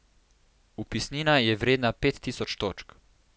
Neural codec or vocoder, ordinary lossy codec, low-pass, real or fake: none; none; none; real